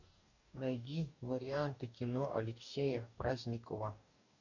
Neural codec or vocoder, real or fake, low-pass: codec, 44.1 kHz, 2.6 kbps, DAC; fake; 7.2 kHz